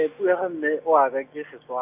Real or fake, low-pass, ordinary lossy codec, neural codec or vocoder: real; 3.6 kHz; MP3, 32 kbps; none